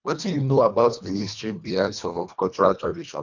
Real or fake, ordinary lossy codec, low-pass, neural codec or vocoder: fake; none; 7.2 kHz; codec, 24 kHz, 1.5 kbps, HILCodec